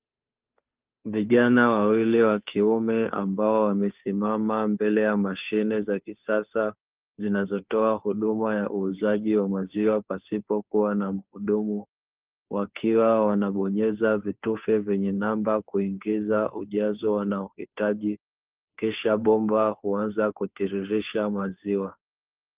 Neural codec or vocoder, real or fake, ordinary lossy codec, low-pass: codec, 16 kHz, 2 kbps, FunCodec, trained on Chinese and English, 25 frames a second; fake; Opus, 32 kbps; 3.6 kHz